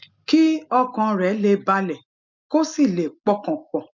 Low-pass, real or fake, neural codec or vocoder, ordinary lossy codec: 7.2 kHz; real; none; MP3, 64 kbps